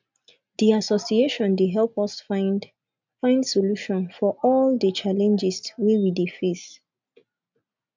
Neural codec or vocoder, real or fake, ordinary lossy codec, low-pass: none; real; MP3, 64 kbps; 7.2 kHz